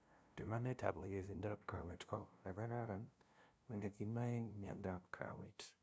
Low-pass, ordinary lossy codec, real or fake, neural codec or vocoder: none; none; fake; codec, 16 kHz, 0.5 kbps, FunCodec, trained on LibriTTS, 25 frames a second